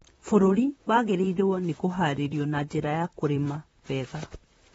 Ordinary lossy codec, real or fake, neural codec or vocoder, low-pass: AAC, 24 kbps; fake; vocoder, 44.1 kHz, 128 mel bands, Pupu-Vocoder; 19.8 kHz